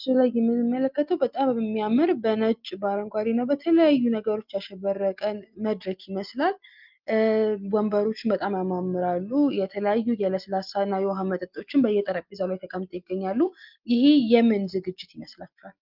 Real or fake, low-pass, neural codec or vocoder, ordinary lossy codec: real; 5.4 kHz; none; Opus, 24 kbps